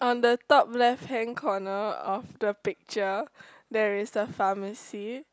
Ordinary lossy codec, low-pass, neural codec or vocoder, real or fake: none; none; none; real